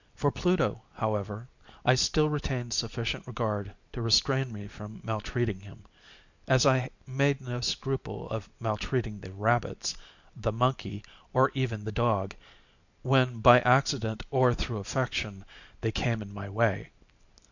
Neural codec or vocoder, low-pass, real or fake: none; 7.2 kHz; real